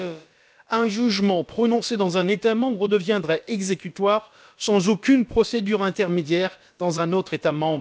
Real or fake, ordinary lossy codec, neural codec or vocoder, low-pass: fake; none; codec, 16 kHz, about 1 kbps, DyCAST, with the encoder's durations; none